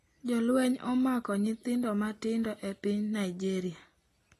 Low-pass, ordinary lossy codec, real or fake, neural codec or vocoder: 14.4 kHz; AAC, 48 kbps; real; none